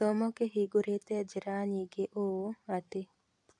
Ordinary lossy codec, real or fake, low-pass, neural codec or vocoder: AAC, 64 kbps; fake; 10.8 kHz; vocoder, 44.1 kHz, 128 mel bands every 256 samples, BigVGAN v2